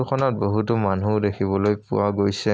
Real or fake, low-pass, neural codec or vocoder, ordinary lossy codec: real; none; none; none